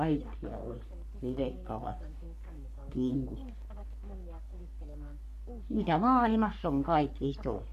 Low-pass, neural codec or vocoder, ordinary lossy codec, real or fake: 14.4 kHz; codec, 44.1 kHz, 3.4 kbps, Pupu-Codec; MP3, 96 kbps; fake